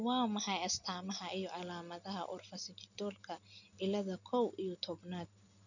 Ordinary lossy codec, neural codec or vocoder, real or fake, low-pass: none; none; real; 7.2 kHz